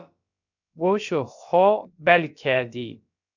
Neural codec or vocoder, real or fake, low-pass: codec, 16 kHz, about 1 kbps, DyCAST, with the encoder's durations; fake; 7.2 kHz